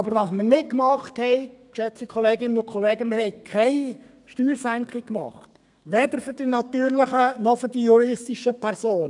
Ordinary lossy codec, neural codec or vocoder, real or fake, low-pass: none; codec, 32 kHz, 1.9 kbps, SNAC; fake; 10.8 kHz